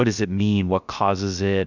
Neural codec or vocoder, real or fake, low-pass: codec, 16 kHz, 0.3 kbps, FocalCodec; fake; 7.2 kHz